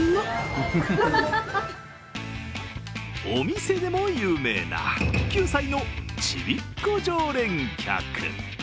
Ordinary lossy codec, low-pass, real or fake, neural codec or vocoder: none; none; real; none